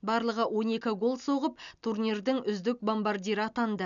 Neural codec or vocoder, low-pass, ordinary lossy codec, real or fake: none; 7.2 kHz; none; real